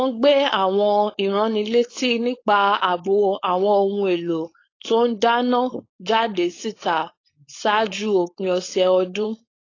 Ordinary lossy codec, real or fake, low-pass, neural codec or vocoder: AAC, 32 kbps; fake; 7.2 kHz; codec, 16 kHz, 4.8 kbps, FACodec